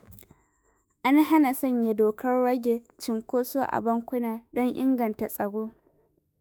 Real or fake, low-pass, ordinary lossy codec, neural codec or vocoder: fake; none; none; autoencoder, 48 kHz, 32 numbers a frame, DAC-VAE, trained on Japanese speech